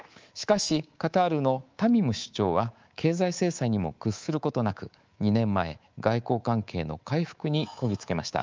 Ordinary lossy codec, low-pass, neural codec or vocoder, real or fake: Opus, 24 kbps; 7.2 kHz; codec, 24 kHz, 3.1 kbps, DualCodec; fake